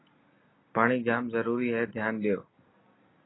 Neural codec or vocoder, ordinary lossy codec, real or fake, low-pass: none; AAC, 16 kbps; real; 7.2 kHz